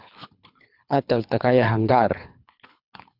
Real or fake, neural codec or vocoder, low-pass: fake; codec, 24 kHz, 3 kbps, HILCodec; 5.4 kHz